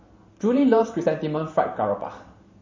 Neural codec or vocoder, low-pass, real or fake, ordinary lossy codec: vocoder, 44.1 kHz, 128 mel bands every 512 samples, BigVGAN v2; 7.2 kHz; fake; MP3, 32 kbps